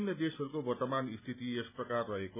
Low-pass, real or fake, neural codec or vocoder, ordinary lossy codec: 3.6 kHz; real; none; none